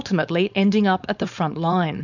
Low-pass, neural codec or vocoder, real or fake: 7.2 kHz; codec, 16 kHz, 4.8 kbps, FACodec; fake